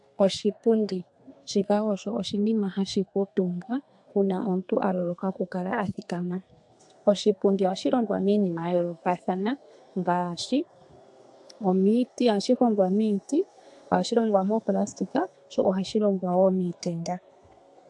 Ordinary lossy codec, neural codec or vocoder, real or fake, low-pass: AAC, 64 kbps; codec, 32 kHz, 1.9 kbps, SNAC; fake; 10.8 kHz